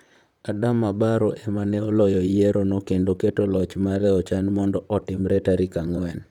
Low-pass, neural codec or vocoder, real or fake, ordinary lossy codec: 19.8 kHz; vocoder, 44.1 kHz, 128 mel bands, Pupu-Vocoder; fake; none